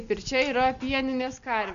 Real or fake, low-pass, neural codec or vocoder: real; 7.2 kHz; none